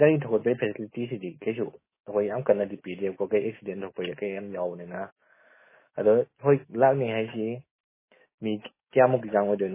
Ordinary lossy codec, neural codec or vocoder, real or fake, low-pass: MP3, 16 kbps; autoencoder, 48 kHz, 128 numbers a frame, DAC-VAE, trained on Japanese speech; fake; 3.6 kHz